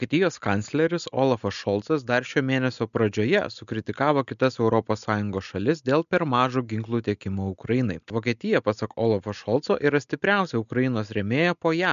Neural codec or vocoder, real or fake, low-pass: none; real; 7.2 kHz